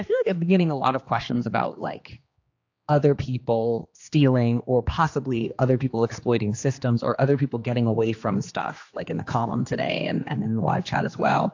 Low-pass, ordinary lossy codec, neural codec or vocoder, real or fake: 7.2 kHz; AAC, 48 kbps; codec, 16 kHz, 2 kbps, X-Codec, HuBERT features, trained on general audio; fake